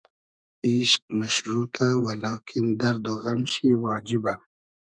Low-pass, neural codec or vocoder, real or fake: 9.9 kHz; codec, 32 kHz, 1.9 kbps, SNAC; fake